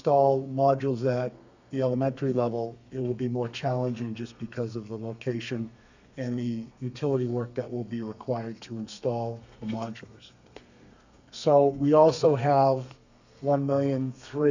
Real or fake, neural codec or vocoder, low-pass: fake; codec, 32 kHz, 1.9 kbps, SNAC; 7.2 kHz